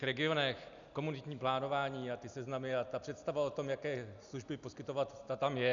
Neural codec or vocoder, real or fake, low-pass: none; real; 7.2 kHz